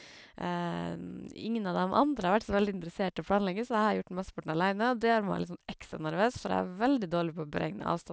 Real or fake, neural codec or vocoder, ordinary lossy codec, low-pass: real; none; none; none